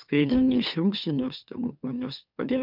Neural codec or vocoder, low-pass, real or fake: autoencoder, 44.1 kHz, a latent of 192 numbers a frame, MeloTTS; 5.4 kHz; fake